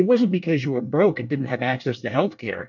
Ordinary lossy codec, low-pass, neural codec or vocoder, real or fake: MP3, 64 kbps; 7.2 kHz; codec, 24 kHz, 1 kbps, SNAC; fake